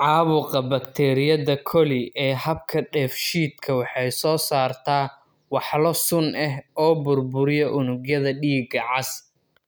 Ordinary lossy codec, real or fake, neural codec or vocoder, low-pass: none; real; none; none